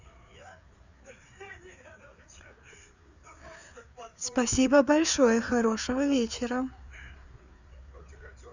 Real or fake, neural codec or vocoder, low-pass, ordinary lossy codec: fake; codec, 16 kHz, 4 kbps, FreqCodec, larger model; 7.2 kHz; none